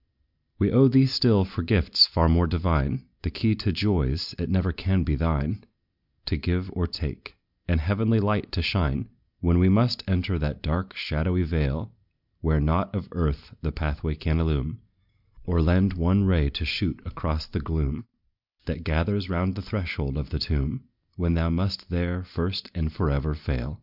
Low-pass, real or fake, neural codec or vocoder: 5.4 kHz; real; none